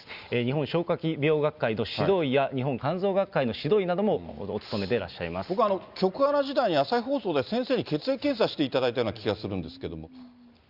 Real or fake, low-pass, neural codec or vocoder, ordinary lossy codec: real; 5.4 kHz; none; Opus, 64 kbps